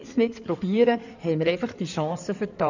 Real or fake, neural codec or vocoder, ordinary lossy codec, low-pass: fake; codec, 16 kHz in and 24 kHz out, 2.2 kbps, FireRedTTS-2 codec; none; 7.2 kHz